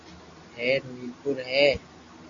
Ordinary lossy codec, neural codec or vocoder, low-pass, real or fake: AAC, 64 kbps; none; 7.2 kHz; real